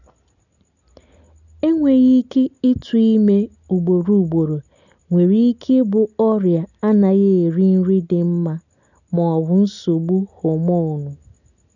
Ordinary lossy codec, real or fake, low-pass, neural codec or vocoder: none; real; 7.2 kHz; none